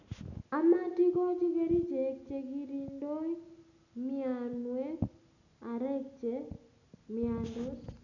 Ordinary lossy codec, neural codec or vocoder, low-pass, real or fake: none; none; 7.2 kHz; real